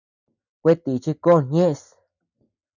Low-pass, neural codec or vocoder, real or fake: 7.2 kHz; none; real